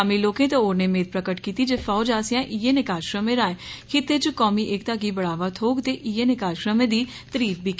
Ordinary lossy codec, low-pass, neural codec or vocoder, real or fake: none; none; none; real